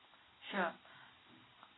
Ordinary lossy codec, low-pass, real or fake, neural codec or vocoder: AAC, 16 kbps; 7.2 kHz; real; none